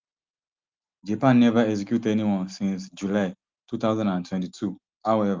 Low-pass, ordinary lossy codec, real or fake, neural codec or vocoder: 7.2 kHz; Opus, 32 kbps; real; none